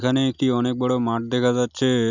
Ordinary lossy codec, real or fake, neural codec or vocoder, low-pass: none; real; none; 7.2 kHz